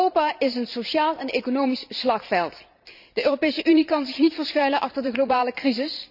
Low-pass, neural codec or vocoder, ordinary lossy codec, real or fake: 5.4 kHz; vocoder, 44.1 kHz, 128 mel bands every 512 samples, BigVGAN v2; none; fake